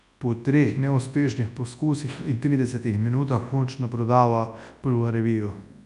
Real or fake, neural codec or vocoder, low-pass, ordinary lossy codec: fake; codec, 24 kHz, 0.9 kbps, WavTokenizer, large speech release; 10.8 kHz; none